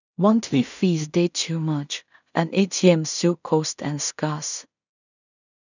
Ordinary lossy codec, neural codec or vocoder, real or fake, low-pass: none; codec, 16 kHz in and 24 kHz out, 0.4 kbps, LongCat-Audio-Codec, two codebook decoder; fake; 7.2 kHz